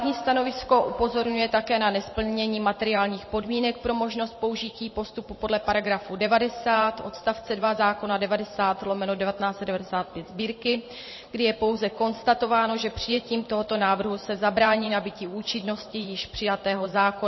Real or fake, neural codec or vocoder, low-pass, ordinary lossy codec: fake; vocoder, 44.1 kHz, 128 mel bands every 512 samples, BigVGAN v2; 7.2 kHz; MP3, 24 kbps